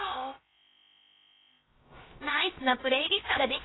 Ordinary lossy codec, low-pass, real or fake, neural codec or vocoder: AAC, 16 kbps; 7.2 kHz; fake; codec, 16 kHz, about 1 kbps, DyCAST, with the encoder's durations